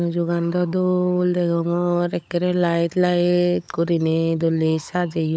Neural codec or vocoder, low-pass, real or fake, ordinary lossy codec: codec, 16 kHz, 16 kbps, FunCodec, trained on LibriTTS, 50 frames a second; none; fake; none